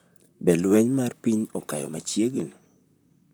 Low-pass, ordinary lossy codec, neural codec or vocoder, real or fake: none; none; vocoder, 44.1 kHz, 128 mel bands, Pupu-Vocoder; fake